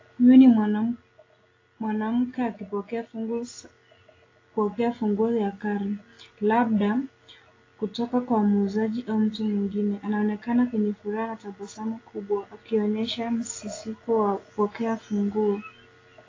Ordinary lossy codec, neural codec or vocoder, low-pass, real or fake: AAC, 32 kbps; none; 7.2 kHz; real